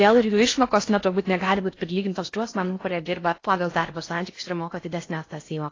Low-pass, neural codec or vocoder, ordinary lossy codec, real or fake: 7.2 kHz; codec, 16 kHz in and 24 kHz out, 0.6 kbps, FocalCodec, streaming, 4096 codes; AAC, 32 kbps; fake